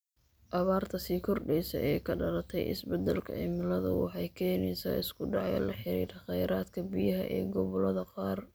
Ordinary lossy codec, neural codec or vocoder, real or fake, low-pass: none; none; real; none